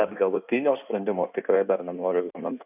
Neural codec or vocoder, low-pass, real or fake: codec, 16 kHz in and 24 kHz out, 1.1 kbps, FireRedTTS-2 codec; 3.6 kHz; fake